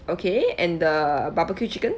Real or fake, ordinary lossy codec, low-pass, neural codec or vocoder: real; none; none; none